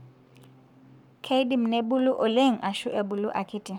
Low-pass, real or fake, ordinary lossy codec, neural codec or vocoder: 19.8 kHz; fake; none; codec, 44.1 kHz, 7.8 kbps, Pupu-Codec